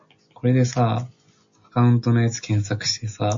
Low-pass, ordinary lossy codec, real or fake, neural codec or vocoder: 7.2 kHz; MP3, 48 kbps; real; none